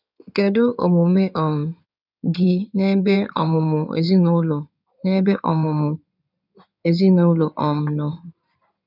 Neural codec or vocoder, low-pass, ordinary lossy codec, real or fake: codec, 16 kHz in and 24 kHz out, 2.2 kbps, FireRedTTS-2 codec; 5.4 kHz; none; fake